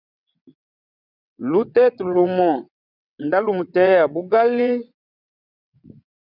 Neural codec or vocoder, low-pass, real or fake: vocoder, 22.05 kHz, 80 mel bands, WaveNeXt; 5.4 kHz; fake